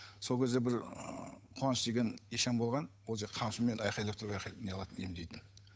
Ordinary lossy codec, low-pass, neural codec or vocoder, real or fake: none; none; codec, 16 kHz, 8 kbps, FunCodec, trained on Chinese and English, 25 frames a second; fake